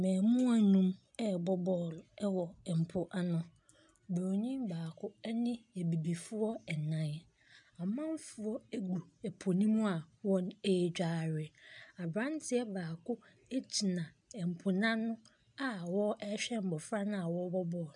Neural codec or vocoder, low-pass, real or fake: none; 10.8 kHz; real